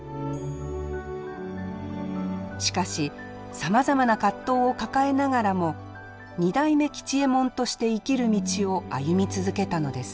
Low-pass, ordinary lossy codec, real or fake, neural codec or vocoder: none; none; real; none